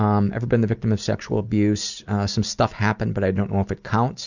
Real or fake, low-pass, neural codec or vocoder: real; 7.2 kHz; none